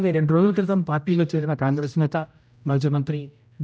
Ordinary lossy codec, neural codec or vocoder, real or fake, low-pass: none; codec, 16 kHz, 0.5 kbps, X-Codec, HuBERT features, trained on general audio; fake; none